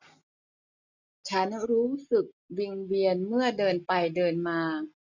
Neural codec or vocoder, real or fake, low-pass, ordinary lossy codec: none; real; 7.2 kHz; none